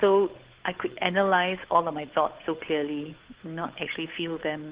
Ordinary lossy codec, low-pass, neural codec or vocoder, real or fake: Opus, 16 kbps; 3.6 kHz; codec, 16 kHz, 8 kbps, FunCodec, trained on Chinese and English, 25 frames a second; fake